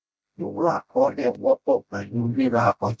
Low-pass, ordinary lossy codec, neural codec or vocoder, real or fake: none; none; codec, 16 kHz, 0.5 kbps, FreqCodec, smaller model; fake